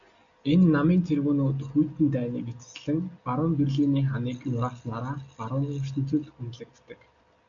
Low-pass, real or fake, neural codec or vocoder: 7.2 kHz; real; none